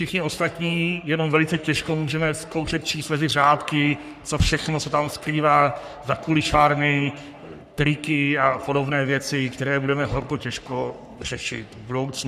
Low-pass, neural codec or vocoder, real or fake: 14.4 kHz; codec, 44.1 kHz, 3.4 kbps, Pupu-Codec; fake